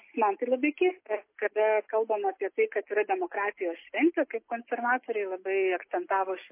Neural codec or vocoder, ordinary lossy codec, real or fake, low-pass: none; MP3, 24 kbps; real; 3.6 kHz